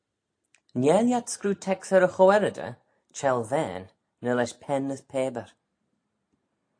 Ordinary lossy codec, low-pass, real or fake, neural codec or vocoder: AAC, 48 kbps; 9.9 kHz; real; none